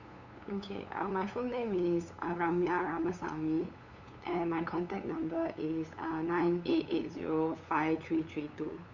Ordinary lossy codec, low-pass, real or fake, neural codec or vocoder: none; 7.2 kHz; fake; codec, 16 kHz, 8 kbps, FunCodec, trained on LibriTTS, 25 frames a second